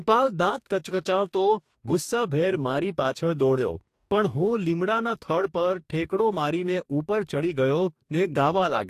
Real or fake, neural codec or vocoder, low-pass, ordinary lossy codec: fake; codec, 44.1 kHz, 2.6 kbps, DAC; 14.4 kHz; AAC, 64 kbps